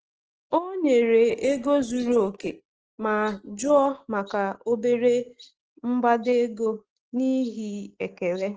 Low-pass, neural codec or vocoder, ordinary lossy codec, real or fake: 7.2 kHz; none; Opus, 16 kbps; real